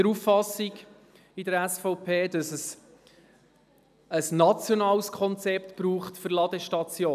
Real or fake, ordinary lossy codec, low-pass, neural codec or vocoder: real; none; 14.4 kHz; none